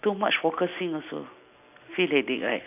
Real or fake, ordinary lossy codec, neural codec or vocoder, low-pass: real; none; none; 3.6 kHz